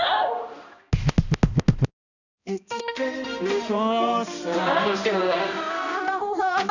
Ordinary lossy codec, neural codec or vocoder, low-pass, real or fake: none; codec, 16 kHz, 1 kbps, X-Codec, HuBERT features, trained on balanced general audio; 7.2 kHz; fake